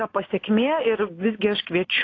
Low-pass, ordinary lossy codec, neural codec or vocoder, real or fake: 7.2 kHz; AAC, 32 kbps; none; real